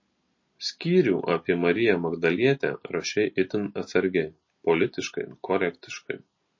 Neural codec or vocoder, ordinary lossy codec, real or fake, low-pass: none; MP3, 32 kbps; real; 7.2 kHz